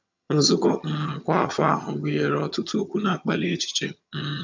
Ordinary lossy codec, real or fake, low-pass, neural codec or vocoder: MP3, 64 kbps; fake; 7.2 kHz; vocoder, 22.05 kHz, 80 mel bands, HiFi-GAN